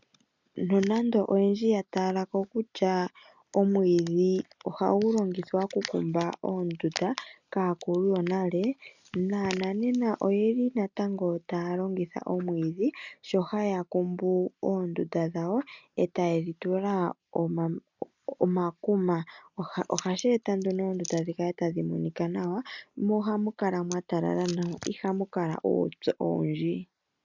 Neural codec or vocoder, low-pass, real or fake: none; 7.2 kHz; real